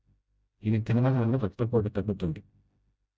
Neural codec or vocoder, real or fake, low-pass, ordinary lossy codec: codec, 16 kHz, 0.5 kbps, FreqCodec, smaller model; fake; none; none